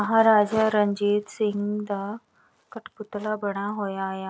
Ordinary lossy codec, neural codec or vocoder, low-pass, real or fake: none; none; none; real